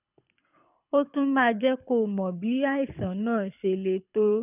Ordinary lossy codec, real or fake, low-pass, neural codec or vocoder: none; fake; 3.6 kHz; codec, 24 kHz, 6 kbps, HILCodec